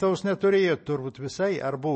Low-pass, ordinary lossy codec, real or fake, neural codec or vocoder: 9.9 kHz; MP3, 32 kbps; real; none